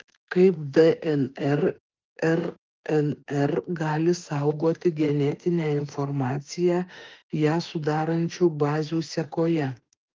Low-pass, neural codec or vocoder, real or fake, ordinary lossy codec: 7.2 kHz; autoencoder, 48 kHz, 32 numbers a frame, DAC-VAE, trained on Japanese speech; fake; Opus, 32 kbps